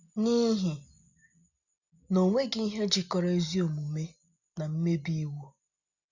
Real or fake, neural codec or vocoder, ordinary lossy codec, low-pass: real; none; none; 7.2 kHz